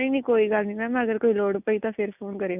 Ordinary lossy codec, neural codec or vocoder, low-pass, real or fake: none; none; 3.6 kHz; real